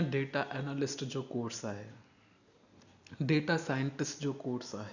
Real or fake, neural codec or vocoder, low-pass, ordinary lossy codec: real; none; 7.2 kHz; none